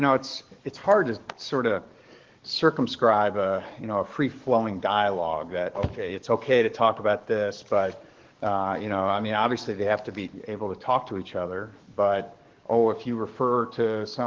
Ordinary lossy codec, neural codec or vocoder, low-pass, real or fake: Opus, 16 kbps; codec, 44.1 kHz, 7.8 kbps, DAC; 7.2 kHz; fake